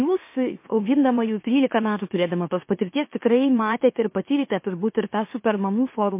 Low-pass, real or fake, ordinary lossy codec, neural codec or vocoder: 3.6 kHz; fake; MP3, 24 kbps; autoencoder, 44.1 kHz, a latent of 192 numbers a frame, MeloTTS